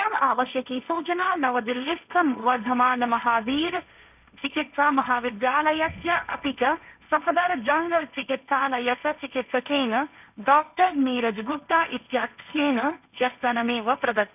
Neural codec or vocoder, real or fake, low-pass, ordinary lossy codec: codec, 16 kHz, 1.1 kbps, Voila-Tokenizer; fake; 3.6 kHz; none